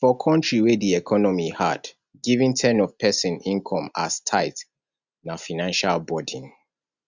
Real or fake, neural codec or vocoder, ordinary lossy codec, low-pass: real; none; Opus, 64 kbps; 7.2 kHz